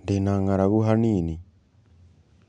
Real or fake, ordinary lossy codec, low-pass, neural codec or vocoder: real; none; 9.9 kHz; none